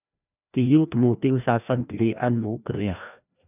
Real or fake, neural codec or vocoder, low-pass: fake; codec, 16 kHz, 1 kbps, FreqCodec, larger model; 3.6 kHz